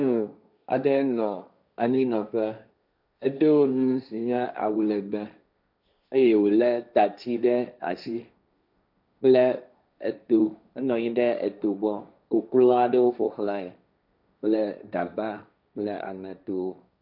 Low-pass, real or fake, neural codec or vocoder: 5.4 kHz; fake; codec, 16 kHz, 1.1 kbps, Voila-Tokenizer